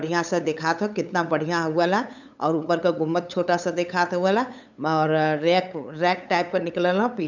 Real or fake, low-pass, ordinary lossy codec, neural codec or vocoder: fake; 7.2 kHz; none; codec, 16 kHz, 8 kbps, FunCodec, trained on LibriTTS, 25 frames a second